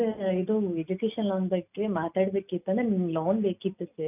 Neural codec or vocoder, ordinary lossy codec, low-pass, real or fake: none; none; 3.6 kHz; real